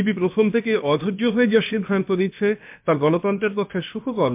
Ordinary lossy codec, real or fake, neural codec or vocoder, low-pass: MP3, 32 kbps; fake; codec, 16 kHz, about 1 kbps, DyCAST, with the encoder's durations; 3.6 kHz